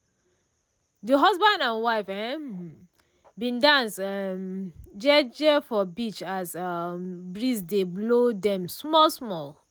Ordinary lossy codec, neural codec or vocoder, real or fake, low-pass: none; none; real; none